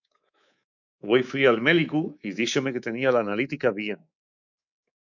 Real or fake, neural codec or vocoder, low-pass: fake; codec, 24 kHz, 3.1 kbps, DualCodec; 7.2 kHz